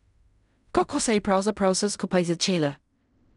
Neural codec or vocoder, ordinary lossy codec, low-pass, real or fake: codec, 16 kHz in and 24 kHz out, 0.4 kbps, LongCat-Audio-Codec, fine tuned four codebook decoder; none; 10.8 kHz; fake